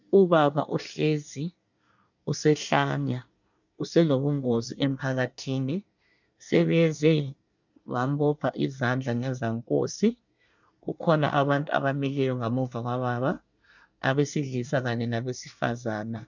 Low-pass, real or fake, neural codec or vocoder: 7.2 kHz; fake; codec, 24 kHz, 1 kbps, SNAC